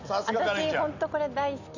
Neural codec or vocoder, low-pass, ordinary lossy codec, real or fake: none; 7.2 kHz; none; real